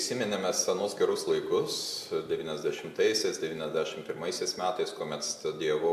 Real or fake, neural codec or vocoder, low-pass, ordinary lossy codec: real; none; 14.4 kHz; AAC, 96 kbps